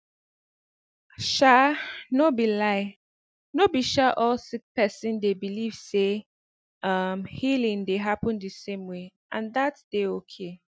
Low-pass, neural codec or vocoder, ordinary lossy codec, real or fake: none; none; none; real